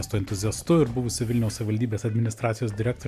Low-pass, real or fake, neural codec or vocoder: 14.4 kHz; fake; vocoder, 44.1 kHz, 128 mel bands every 256 samples, BigVGAN v2